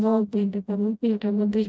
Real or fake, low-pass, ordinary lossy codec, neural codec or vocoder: fake; none; none; codec, 16 kHz, 0.5 kbps, FreqCodec, smaller model